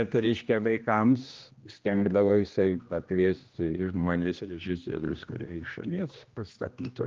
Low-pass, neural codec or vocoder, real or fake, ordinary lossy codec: 7.2 kHz; codec, 16 kHz, 1 kbps, X-Codec, HuBERT features, trained on general audio; fake; Opus, 24 kbps